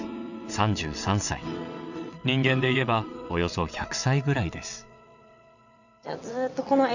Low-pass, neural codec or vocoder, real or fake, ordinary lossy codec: 7.2 kHz; vocoder, 22.05 kHz, 80 mel bands, WaveNeXt; fake; none